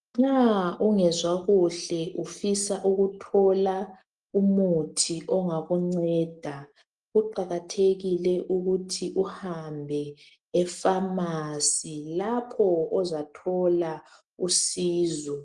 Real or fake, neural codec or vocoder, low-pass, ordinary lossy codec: real; none; 10.8 kHz; Opus, 24 kbps